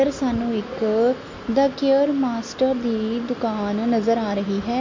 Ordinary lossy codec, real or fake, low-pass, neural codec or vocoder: MP3, 48 kbps; real; 7.2 kHz; none